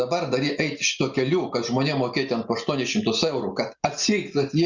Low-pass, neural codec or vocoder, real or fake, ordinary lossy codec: 7.2 kHz; none; real; Opus, 64 kbps